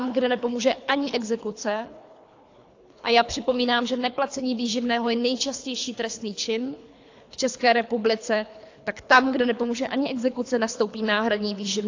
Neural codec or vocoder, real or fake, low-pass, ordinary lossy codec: codec, 24 kHz, 3 kbps, HILCodec; fake; 7.2 kHz; AAC, 48 kbps